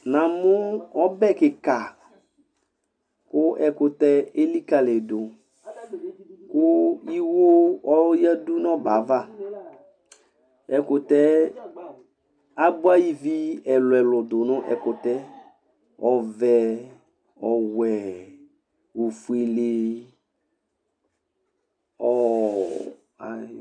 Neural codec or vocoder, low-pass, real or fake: none; 9.9 kHz; real